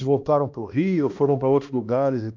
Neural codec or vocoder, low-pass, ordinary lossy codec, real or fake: codec, 16 kHz, 1 kbps, X-Codec, HuBERT features, trained on balanced general audio; 7.2 kHz; MP3, 64 kbps; fake